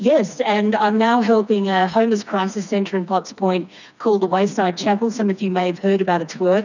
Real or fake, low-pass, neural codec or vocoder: fake; 7.2 kHz; codec, 16 kHz, 2 kbps, FreqCodec, smaller model